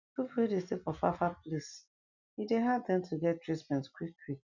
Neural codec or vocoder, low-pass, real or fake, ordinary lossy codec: none; 7.2 kHz; real; none